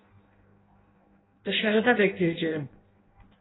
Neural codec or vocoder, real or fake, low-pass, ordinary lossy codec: codec, 16 kHz in and 24 kHz out, 0.6 kbps, FireRedTTS-2 codec; fake; 7.2 kHz; AAC, 16 kbps